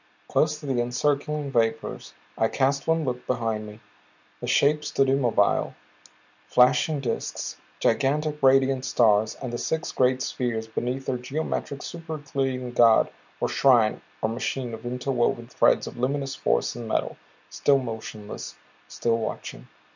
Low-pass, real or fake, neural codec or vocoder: 7.2 kHz; real; none